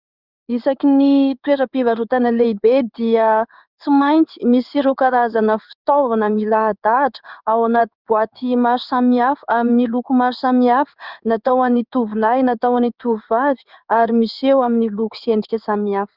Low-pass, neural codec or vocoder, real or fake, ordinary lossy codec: 5.4 kHz; codec, 16 kHz in and 24 kHz out, 1 kbps, XY-Tokenizer; fake; Opus, 64 kbps